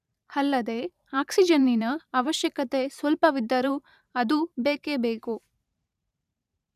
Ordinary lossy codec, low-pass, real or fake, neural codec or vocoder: none; 14.4 kHz; fake; vocoder, 44.1 kHz, 128 mel bands every 512 samples, BigVGAN v2